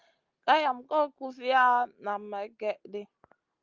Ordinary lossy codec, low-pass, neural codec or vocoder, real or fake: Opus, 32 kbps; 7.2 kHz; none; real